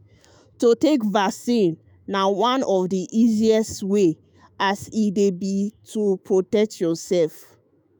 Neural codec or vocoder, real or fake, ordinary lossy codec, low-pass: autoencoder, 48 kHz, 128 numbers a frame, DAC-VAE, trained on Japanese speech; fake; none; none